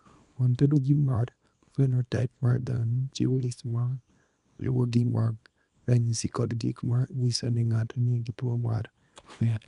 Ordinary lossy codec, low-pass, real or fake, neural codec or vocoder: none; 10.8 kHz; fake; codec, 24 kHz, 0.9 kbps, WavTokenizer, small release